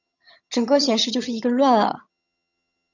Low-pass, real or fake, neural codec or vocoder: 7.2 kHz; fake; vocoder, 22.05 kHz, 80 mel bands, HiFi-GAN